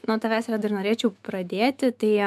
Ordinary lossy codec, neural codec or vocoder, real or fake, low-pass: MP3, 96 kbps; autoencoder, 48 kHz, 128 numbers a frame, DAC-VAE, trained on Japanese speech; fake; 14.4 kHz